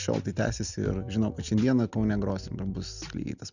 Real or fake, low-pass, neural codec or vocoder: real; 7.2 kHz; none